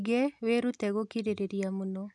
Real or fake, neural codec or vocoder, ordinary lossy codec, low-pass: real; none; none; none